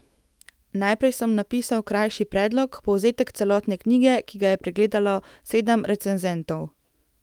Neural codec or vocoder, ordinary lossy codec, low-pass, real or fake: autoencoder, 48 kHz, 128 numbers a frame, DAC-VAE, trained on Japanese speech; Opus, 32 kbps; 19.8 kHz; fake